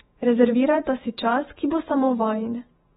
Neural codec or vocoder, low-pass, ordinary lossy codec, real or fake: vocoder, 48 kHz, 128 mel bands, Vocos; 19.8 kHz; AAC, 16 kbps; fake